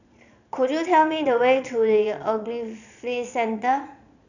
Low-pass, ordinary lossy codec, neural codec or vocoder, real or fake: 7.2 kHz; none; vocoder, 22.05 kHz, 80 mel bands, Vocos; fake